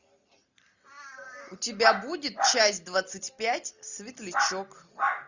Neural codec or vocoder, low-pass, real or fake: none; 7.2 kHz; real